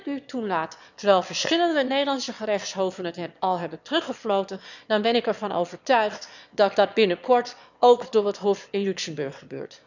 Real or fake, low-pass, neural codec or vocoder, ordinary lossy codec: fake; 7.2 kHz; autoencoder, 22.05 kHz, a latent of 192 numbers a frame, VITS, trained on one speaker; none